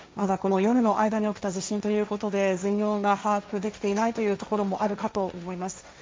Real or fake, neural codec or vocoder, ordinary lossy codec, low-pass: fake; codec, 16 kHz, 1.1 kbps, Voila-Tokenizer; none; none